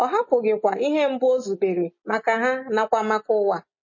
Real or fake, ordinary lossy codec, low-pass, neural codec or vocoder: real; MP3, 32 kbps; 7.2 kHz; none